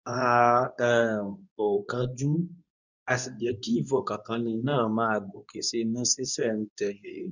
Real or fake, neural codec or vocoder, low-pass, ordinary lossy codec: fake; codec, 24 kHz, 0.9 kbps, WavTokenizer, medium speech release version 2; 7.2 kHz; none